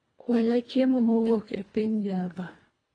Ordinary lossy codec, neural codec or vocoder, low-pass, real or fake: AAC, 32 kbps; codec, 24 kHz, 1.5 kbps, HILCodec; 9.9 kHz; fake